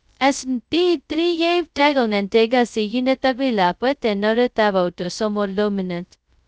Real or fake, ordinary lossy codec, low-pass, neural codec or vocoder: fake; none; none; codec, 16 kHz, 0.2 kbps, FocalCodec